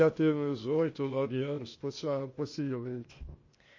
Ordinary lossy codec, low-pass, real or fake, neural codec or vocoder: MP3, 32 kbps; 7.2 kHz; fake; codec, 16 kHz, 0.8 kbps, ZipCodec